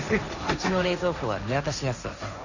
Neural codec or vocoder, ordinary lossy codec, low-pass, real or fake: codec, 16 kHz, 1.1 kbps, Voila-Tokenizer; none; 7.2 kHz; fake